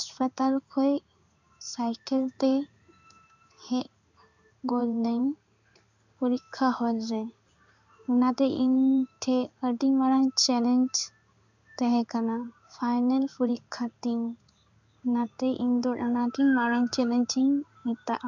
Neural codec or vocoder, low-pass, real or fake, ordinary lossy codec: codec, 16 kHz in and 24 kHz out, 1 kbps, XY-Tokenizer; 7.2 kHz; fake; none